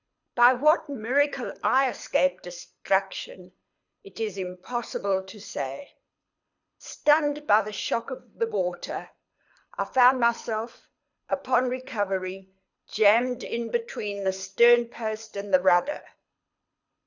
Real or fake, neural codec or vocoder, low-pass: fake; codec, 24 kHz, 6 kbps, HILCodec; 7.2 kHz